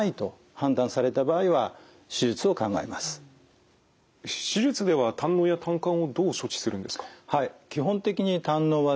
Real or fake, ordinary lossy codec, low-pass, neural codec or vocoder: real; none; none; none